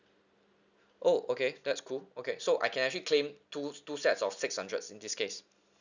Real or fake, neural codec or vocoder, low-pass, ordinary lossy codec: real; none; 7.2 kHz; none